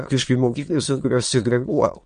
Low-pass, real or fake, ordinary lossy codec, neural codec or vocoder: 9.9 kHz; fake; MP3, 48 kbps; autoencoder, 22.05 kHz, a latent of 192 numbers a frame, VITS, trained on many speakers